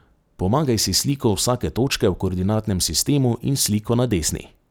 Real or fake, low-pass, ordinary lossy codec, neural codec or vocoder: real; none; none; none